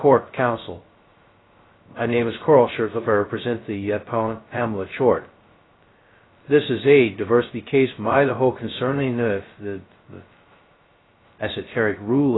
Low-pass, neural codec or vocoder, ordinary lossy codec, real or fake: 7.2 kHz; codec, 16 kHz, 0.2 kbps, FocalCodec; AAC, 16 kbps; fake